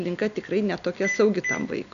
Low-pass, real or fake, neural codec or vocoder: 7.2 kHz; real; none